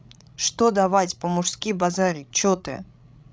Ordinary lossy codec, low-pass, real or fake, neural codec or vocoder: none; none; fake; codec, 16 kHz, 16 kbps, FreqCodec, larger model